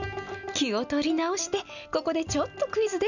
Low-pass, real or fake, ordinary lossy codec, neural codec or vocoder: 7.2 kHz; real; none; none